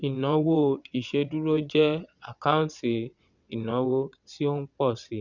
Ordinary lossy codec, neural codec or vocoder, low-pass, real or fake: none; vocoder, 22.05 kHz, 80 mel bands, WaveNeXt; 7.2 kHz; fake